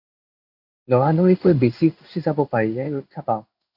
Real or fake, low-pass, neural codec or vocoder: fake; 5.4 kHz; codec, 16 kHz in and 24 kHz out, 1 kbps, XY-Tokenizer